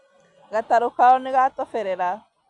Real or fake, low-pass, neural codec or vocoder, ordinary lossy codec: real; 10.8 kHz; none; none